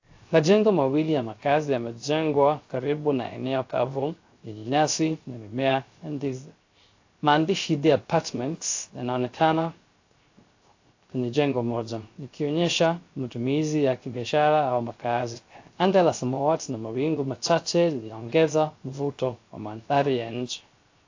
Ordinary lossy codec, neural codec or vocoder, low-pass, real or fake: AAC, 48 kbps; codec, 16 kHz, 0.3 kbps, FocalCodec; 7.2 kHz; fake